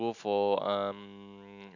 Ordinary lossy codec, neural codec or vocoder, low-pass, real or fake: none; none; 7.2 kHz; real